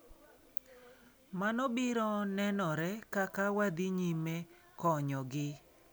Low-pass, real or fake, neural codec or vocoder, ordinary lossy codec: none; real; none; none